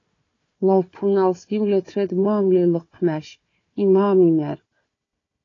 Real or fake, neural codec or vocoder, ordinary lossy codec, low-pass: fake; codec, 16 kHz, 4 kbps, FunCodec, trained on Chinese and English, 50 frames a second; AAC, 32 kbps; 7.2 kHz